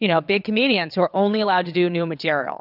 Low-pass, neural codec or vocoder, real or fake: 5.4 kHz; vocoder, 22.05 kHz, 80 mel bands, WaveNeXt; fake